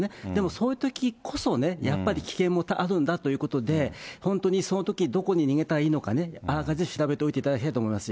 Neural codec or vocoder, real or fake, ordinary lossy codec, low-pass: none; real; none; none